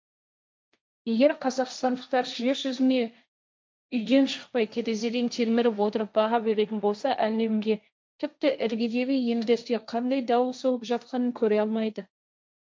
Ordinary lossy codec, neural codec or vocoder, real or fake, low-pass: none; codec, 16 kHz, 1.1 kbps, Voila-Tokenizer; fake; none